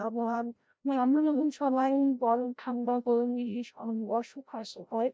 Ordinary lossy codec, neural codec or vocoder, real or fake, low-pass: none; codec, 16 kHz, 0.5 kbps, FreqCodec, larger model; fake; none